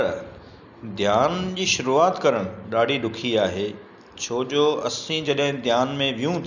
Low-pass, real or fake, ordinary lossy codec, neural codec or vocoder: 7.2 kHz; real; none; none